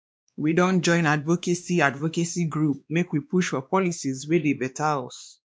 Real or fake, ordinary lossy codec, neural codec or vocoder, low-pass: fake; none; codec, 16 kHz, 2 kbps, X-Codec, WavLM features, trained on Multilingual LibriSpeech; none